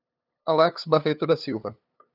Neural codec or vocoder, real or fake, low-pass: codec, 16 kHz, 2 kbps, FunCodec, trained on LibriTTS, 25 frames a second; fake; 5.4 kHz